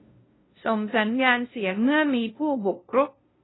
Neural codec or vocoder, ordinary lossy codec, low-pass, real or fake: codec, 16 kHz, 0.5 kbps, FunCodec, trained on LibriTTS, 25 frames a second; AAC, 16 kbps; 7.2 kHz; fake